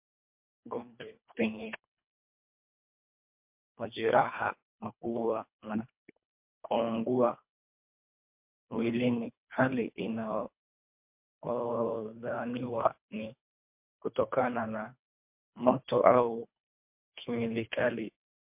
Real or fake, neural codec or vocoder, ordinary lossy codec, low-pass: fake; codec, 24 kHz, 1.5 kbps, HILCodec; MP3, 32 kbps; 3.6 kHz